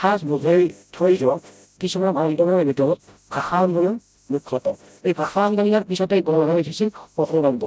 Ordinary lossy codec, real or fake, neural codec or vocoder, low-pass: none; fake; codec, 16 kHz, 0.5 kbps, FreqCodec, smaller model; none